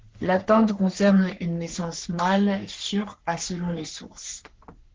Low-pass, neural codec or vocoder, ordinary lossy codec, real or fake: 7.2 kHz; codec, 44.1 kHz, 3.4 kbps, Pupu-Codec; Opus, 16 kbps; fake